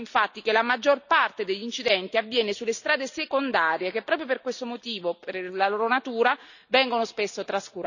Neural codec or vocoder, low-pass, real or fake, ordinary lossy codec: none; 7.2 kHz; real; none